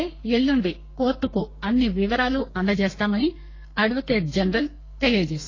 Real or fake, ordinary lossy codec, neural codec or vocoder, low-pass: fake; AAC, 32 kbps; codec, 32 kHz, 1.9 kbps, SNAC; 7.2 kHz